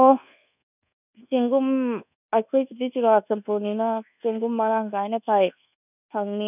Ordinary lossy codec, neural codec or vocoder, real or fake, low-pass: none; codec, 24 kHz, 1.2 kbps, DualCodec; fake; 3.6 kHz